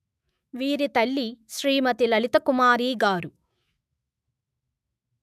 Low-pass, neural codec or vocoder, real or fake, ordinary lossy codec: 14.4 kHz; autoencoder, 48 kHz, 128 numbers a frame, DAC-VAE, trained on Japanese speech; fake; none